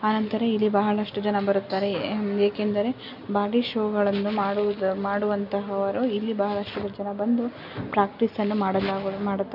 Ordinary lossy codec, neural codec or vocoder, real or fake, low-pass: none; none; real; 5.4 kHz